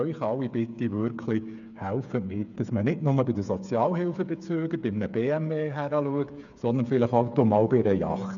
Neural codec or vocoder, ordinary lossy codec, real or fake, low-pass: codec, 16 kHz, 8 kbps, FreqCodec, smaller model; none; fake; 7.2 kHz